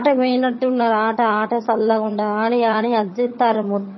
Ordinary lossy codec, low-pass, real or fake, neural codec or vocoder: MP3, 24 kbps; 7.2 kHz; fake; vocoder, 22.05 kHz, 80 mel bands, HiFi-GAN